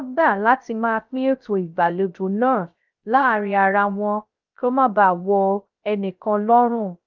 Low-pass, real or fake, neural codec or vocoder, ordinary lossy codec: 7.2 kHz; fake; codec, 16 kHz, 0.3 kbps, FocalCodec; Opus, 24 kbps